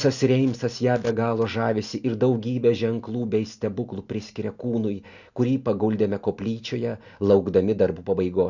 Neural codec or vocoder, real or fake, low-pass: none; real; 7.2 kHz